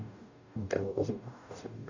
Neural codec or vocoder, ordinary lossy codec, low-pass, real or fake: codec, 44.1 kHz, 0.9 kbps, DAC; none; 7.2 kHz; fake